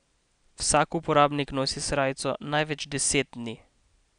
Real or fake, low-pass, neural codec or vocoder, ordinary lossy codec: real; 9.9 kHz; none; none